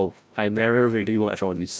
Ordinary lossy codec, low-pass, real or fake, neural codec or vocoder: none; none; fake; codec, 16 kHz, 0.5 kbps, FreqCodec, larger model